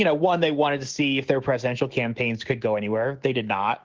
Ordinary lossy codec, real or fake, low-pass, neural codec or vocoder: Opus, 16 kbps; fake; 7.2 kHz; autoencoder, 48 kHz, 128 numbers a frame, DAC-VAE, trained on Japanese speech